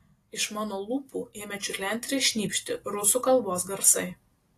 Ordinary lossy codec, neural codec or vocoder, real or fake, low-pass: AAC, 48 kbps; none; real; 14.4 kHz